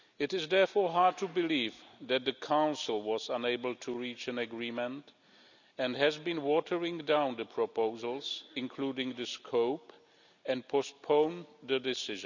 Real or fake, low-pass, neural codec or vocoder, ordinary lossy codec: real; 7.2 kHz; none; none